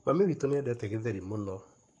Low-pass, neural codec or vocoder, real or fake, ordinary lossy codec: 10.8 kHz; codec, 44.1 kHz, 7.8 kbps, Pupu-Codec; fake; MP3, 48 kbps